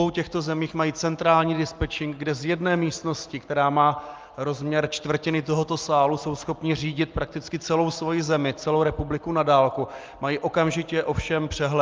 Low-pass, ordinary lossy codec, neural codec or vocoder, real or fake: 7.2 kHz; Opus, 32 kbps; none; real